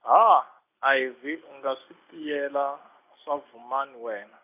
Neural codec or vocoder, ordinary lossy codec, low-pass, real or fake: none; none; 3.6 kHz; real